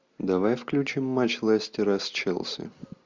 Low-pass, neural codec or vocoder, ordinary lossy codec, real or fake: 7.2 kHz; none; Opus, 64 kbps; real